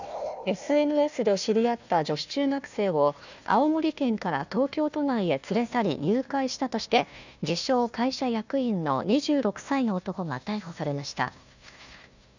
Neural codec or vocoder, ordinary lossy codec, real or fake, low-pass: codec, 16 kHz, 1 kbps, FunCodec, trained on Chinese and English, 50 frames a second; none; fake; 7.2 kHz